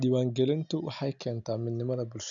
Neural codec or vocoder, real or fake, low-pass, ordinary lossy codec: none; real; 7.2 kHz; none